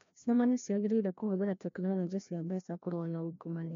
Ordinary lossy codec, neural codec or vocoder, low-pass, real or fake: MP3, 48 kbps; codec, 16 kHz, 1 kbps, FreqCodec, larger model; 7.2 kHz; fake